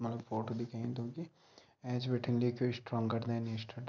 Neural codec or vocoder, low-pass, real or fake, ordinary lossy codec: none; 7.2 kHz; real; none